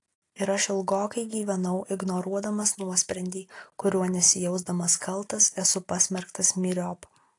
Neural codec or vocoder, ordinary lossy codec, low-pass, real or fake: none; AAC, 48 kbps; 10.8 kHz; real